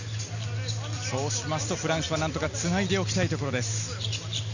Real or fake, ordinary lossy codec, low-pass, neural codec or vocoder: real; none; 7.2 kHz; none